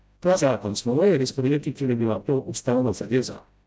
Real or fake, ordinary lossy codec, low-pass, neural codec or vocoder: fake; none; none; codec, 16 kHz, 0.5 kbps, FreqCodec, smaller model